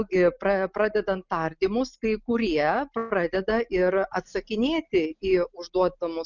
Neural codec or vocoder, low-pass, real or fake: none; 7.2 kHz; real